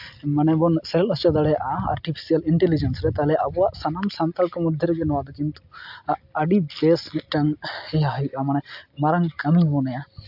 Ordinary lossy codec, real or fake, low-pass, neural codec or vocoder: AAC, 48 kbps; real; 5.4 kHz; none